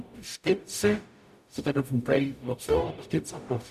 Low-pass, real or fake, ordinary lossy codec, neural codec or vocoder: 14.4 kHz; fake; none; codec, 44.1 kHz, 0.9 kbps, DAC